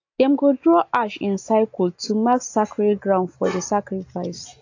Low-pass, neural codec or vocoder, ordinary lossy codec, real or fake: 7.2 kHz; none; AAC, 48 kbps; real